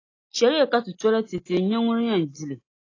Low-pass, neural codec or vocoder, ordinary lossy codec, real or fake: 7.2 kHz; none; AAC, 32 kbps; real